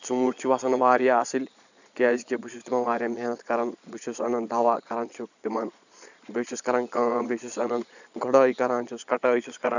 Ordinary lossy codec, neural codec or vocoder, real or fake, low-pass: none; vocoder, 22.05 kHz, 80 mel bands, Vocos; fake; 7.2 kHz